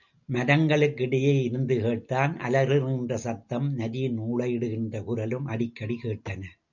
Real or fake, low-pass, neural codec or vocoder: real; 7.2 kHz; none